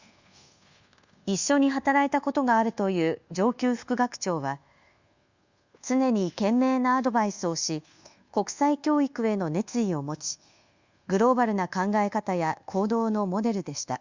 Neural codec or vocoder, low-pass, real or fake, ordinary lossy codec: codec, 24 kHz, 1.2 kbps, DualCodec; 7.2 kHz; fake; Opus, 64 kbps